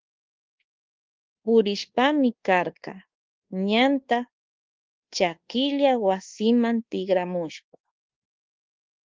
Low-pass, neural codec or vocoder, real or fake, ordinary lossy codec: 7.2 kHz; codec, 24 kHz, 1.2 kbps, DualCodec; fake; Opus, 16 kbps